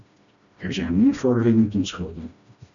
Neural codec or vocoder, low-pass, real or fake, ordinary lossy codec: codec, 16 kHz, 1 kbps, FreqCodec, smaller model; 7.2 kHz; fake; MP3, 96 kbps